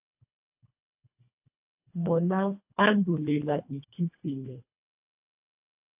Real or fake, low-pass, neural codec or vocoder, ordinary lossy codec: fake; 3.6 kHz; codec, 24 kHz, 1.5 kbps, HILCodec; AAC, 32 kbps